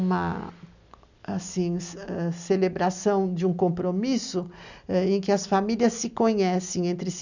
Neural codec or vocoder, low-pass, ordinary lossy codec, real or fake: none; 7.2 kHz; none; real